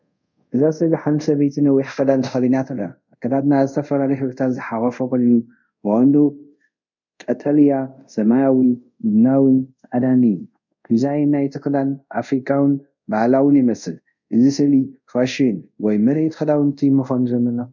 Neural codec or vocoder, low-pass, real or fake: codec, 24 kHz, 0.5 kbps, DualCodec; 7.2 kHz; fake